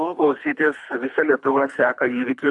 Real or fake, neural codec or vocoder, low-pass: fake; codec, 24 kHz, 3 kbps, HILCodec; 10.8 kHz